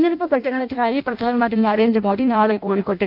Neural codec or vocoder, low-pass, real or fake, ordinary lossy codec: codec, 16 kHz in and 24 kHz out, 0.6 kbps, FireRedTTS-2 codec; 5.4 kHz; fake; none